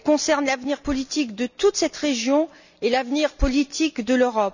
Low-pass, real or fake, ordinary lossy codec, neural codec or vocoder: 7.2 kHz; real; none; none